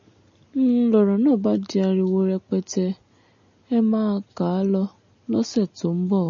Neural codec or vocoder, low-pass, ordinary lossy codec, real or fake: none; 7.2 kHz; MP3, 32 kbps; real